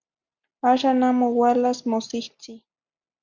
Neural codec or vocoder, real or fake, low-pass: none; real; 7.2 kHz